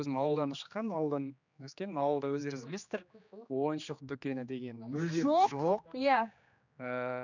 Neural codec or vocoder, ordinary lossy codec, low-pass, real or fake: codec, 16 kHz, 2 kbps, X-Codec, HuBERT features, trained on general audio; none; 7.2 kHz; fake